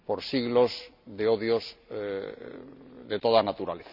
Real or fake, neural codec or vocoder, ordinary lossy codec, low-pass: real; none; none; 5.4 kHz